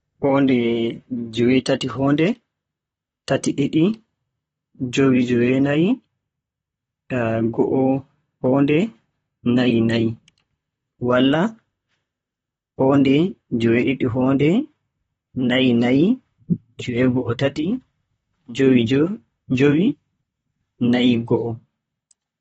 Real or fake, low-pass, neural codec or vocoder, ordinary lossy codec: fake; 19.8 kHz; vocoder, 44.1 kHz, 128 mel bands, Pupu-Vocoder; AAC, 24 kbps